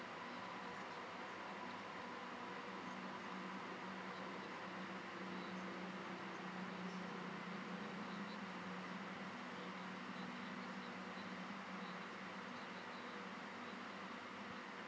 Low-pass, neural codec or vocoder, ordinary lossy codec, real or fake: none; none; none; real